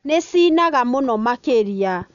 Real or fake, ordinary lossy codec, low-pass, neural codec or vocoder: real; none; 7.2 kHz; none